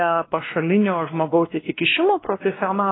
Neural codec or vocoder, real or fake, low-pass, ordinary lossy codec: codec, 16 kHz, 1 kbps, X-Codec, WavLM features, trained on Multilingual LibriSpeech; fake; 7.2 kHz; AAC, 16 kbps